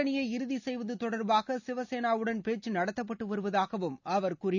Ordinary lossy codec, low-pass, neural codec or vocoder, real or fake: none; 7.2 kHz; none; real